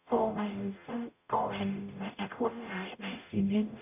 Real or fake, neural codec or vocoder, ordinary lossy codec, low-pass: fake; codec, 44.1 kHz, 0.9 kbps, DAC; none; 3.6 kHz